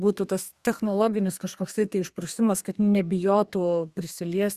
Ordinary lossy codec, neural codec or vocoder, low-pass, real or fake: Opus, 64 kbps; codec, 32 kHz, 1.9 kbps, SNAC; 14.4 kHz; fake